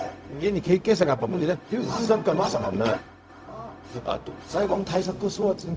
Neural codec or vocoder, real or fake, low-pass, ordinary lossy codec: codec, 16 kHz, 0.4 kbps, LongCat-Audio-Codec; fake; none; none